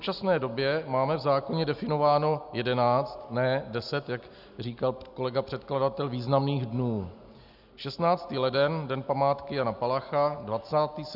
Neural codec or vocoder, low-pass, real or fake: none; 5.4 kHz; real